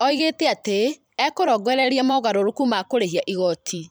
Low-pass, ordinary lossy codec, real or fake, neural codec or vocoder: none; none; fake; vocoder, 44.1 kHz, 128 mel bands every 512 samples, BigVGAN v2